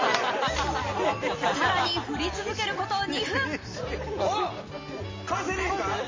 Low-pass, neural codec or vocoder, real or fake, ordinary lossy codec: 7.2 kHz; none; real; MP3, 32 kbps